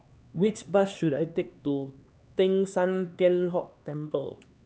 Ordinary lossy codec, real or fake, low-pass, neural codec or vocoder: none; fake; none; codec, 16 kHz, 2 kbps, X-Codec, HuBERT features, trained on LibriSpeech